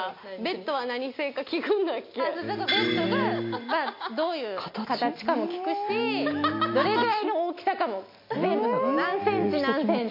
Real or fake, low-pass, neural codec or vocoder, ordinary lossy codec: real; 5.4 kHz; none; MP3, 32 kbps